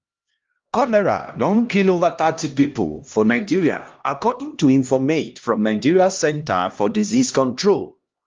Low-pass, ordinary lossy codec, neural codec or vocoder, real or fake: 7.2 kHz; Opus, 32 kbps; codec, 16 kHz, 1 kbps, X-Codec, HuBERT features, trained on LibriSpeech; fake